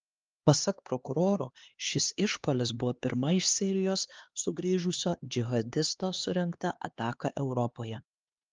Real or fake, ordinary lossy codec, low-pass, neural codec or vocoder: fake; Opus, 32 kbps; 7.2 kHz; codec, 16 kHz, 2 kbps, X-Codec, HuBERT features, trained on LibriSpeech